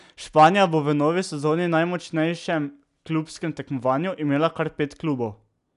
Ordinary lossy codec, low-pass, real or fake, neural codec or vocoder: none; 10.8 kHz; real; none